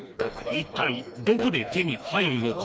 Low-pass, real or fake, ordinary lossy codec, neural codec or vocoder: none; fake; none; codec, 16 kHz, 2 kbps, FreqCodec, smaller model